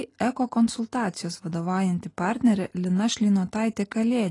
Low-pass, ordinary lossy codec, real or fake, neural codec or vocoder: 10.8 kHz; AAC, 32 kbps; real; none